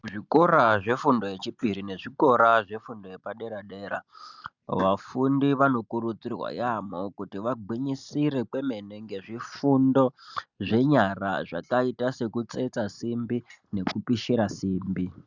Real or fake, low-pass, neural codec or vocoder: real; 7.2 kHz; none